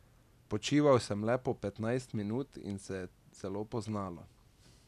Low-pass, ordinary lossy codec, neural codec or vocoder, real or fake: 14.4 kHz; none; none; real